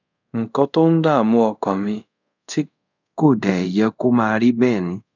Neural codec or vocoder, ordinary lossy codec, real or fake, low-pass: codec, 24 kHz, 0.5 kbps, DualCodec; none; fake; 7.2 kHz